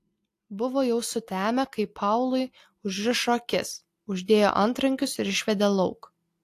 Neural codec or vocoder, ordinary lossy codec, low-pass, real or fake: none; AAC, 64 kbps; 14.4 kHz; real